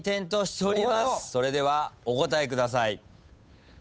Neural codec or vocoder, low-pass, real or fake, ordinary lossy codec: codec, 16 kHz, 8 kbps, FunCodec, trained on Chinese and English, 25 frames a second; none; fake; none